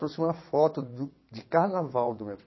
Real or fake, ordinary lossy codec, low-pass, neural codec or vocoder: fake; MP3, 24 kbps; 7.2 kHz; vocoder, 22.05 kHz, 80 mel bands, WaveNeXt